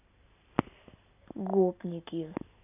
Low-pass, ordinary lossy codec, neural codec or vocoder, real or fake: 3.6 kHz; none; none; real